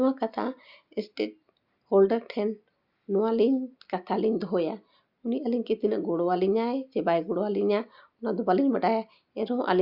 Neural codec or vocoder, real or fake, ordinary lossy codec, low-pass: none; real; Opus, 64 kbps; 5.4 kHz